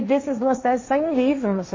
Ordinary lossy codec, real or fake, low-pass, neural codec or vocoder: MP3, 32 kbps; fake; 7.2 kHz; codec, 16 kHz, 1.1 kbps, Voila-Tokenizer